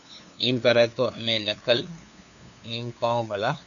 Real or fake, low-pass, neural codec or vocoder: fake; 7.2 kHz; codec, 16 kHz, 2 kbps, FunCodec, trained on LibriTTS, 25 frames a second